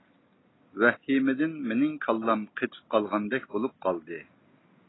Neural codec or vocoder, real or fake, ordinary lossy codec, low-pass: none; real; AAC, 16 kbps; 7.2 kHz